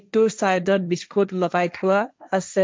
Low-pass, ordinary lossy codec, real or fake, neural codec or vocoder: none; none; fake; codec, 16 kHz, 1.1 kbps, Voila-Tokenizer